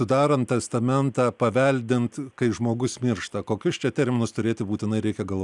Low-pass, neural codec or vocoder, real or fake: 10.8 kHz; none; real